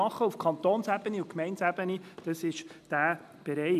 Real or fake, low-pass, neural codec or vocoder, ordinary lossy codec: real; 14.4 kHz; none; none